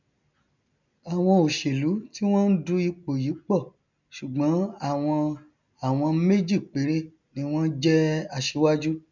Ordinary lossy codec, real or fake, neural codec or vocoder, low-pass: none; real; none; 7.2 kHz